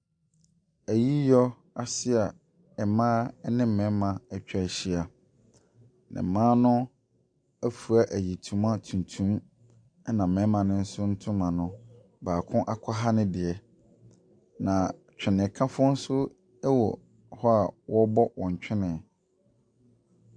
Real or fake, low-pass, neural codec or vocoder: real; 9.9 kHz; none